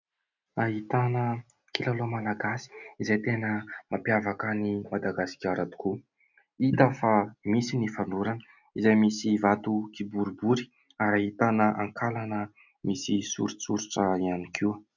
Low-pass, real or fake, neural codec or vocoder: 7.2 kHz; real; none